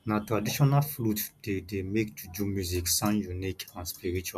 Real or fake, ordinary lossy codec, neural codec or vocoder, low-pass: real; none; none; 14.4 kHz